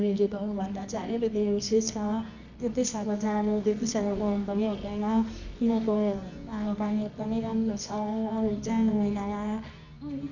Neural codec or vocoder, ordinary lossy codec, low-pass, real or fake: codec, 24 kHz, 0.9 kbps, WavTokenizer, medium music audio release; none; 7.2 kHz; fake